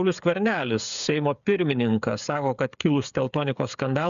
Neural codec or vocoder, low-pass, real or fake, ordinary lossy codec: codec, 16 kHz, 8 kbps, FreqCodec, smaller model; 7.2 kHz; fake; MP3, 96 kbps